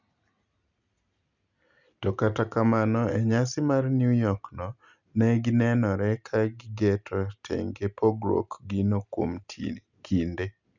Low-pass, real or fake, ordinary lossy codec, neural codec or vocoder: 7.2 kHz; real; none; none